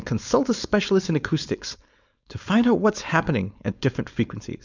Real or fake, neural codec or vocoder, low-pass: fake; codec, 16 kHz, 4.8 kbps, FACodec; 7.2 kHz